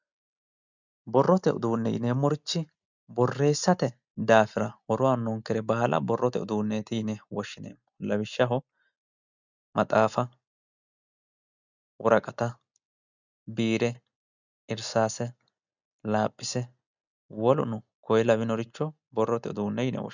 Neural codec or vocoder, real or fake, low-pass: none; real; 7.2 kHz